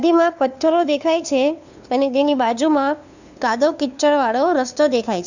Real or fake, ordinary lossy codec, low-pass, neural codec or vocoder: fake; none; 7.2 kHz; codec, 16 kHz, 2 kbps, FunCodec, trained on LibriTTS, 25 frames a second